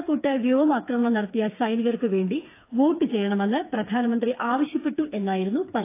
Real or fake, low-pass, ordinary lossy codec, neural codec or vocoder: fake; 3.6 kHz; AAC, 24 kbps; codec, 16 kHz, 4 kbps, FreqCodec, smaller model